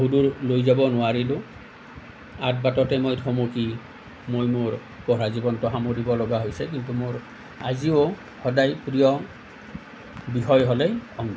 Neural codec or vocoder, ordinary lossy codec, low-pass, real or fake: none; none; none; real